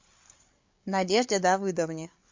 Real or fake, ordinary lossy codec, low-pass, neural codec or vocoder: fake; MP3, 48 kbps; 7.2 kHz; codec, 16 kHz, 8 kbps, FreqCodec, larger model